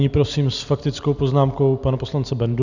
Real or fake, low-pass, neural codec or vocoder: real; 7.2 kHz; none